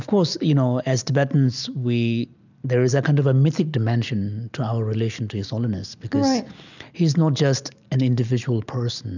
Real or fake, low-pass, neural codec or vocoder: real; 7.2 kHz; none